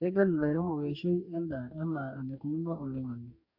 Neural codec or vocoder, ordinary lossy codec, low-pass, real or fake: codec, 44.1 kHz, 2.6 kbps, DAC; MP3, 32 kbps; 5.4 kHz; fake